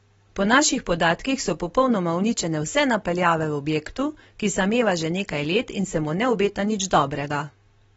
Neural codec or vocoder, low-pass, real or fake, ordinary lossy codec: none; 19.8 kHz; real; AAC, 24 kbps